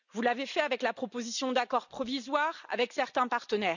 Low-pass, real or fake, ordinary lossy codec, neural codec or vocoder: 7.2 kHz; real; none; none